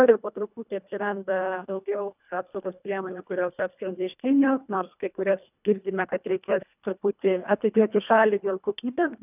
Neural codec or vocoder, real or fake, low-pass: codec, 24 kHz, 1.5 kbps, HILCodec; fake; 3.6 kHz